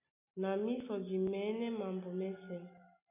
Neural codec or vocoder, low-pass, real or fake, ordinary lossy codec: none; 3.6 kHz; real; MP3, 24 kbps